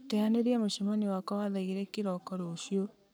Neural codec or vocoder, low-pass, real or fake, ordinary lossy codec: codec, 44.1 kHz, 7.8 kbps, Pupu-Codec; none; fake; none